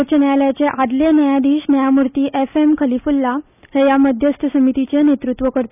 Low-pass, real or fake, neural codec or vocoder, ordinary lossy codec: 3.6 kHz; real; none; none